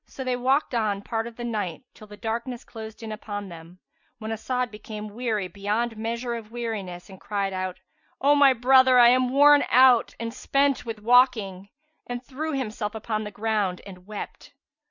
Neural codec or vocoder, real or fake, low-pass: none; real; 7.2 kHz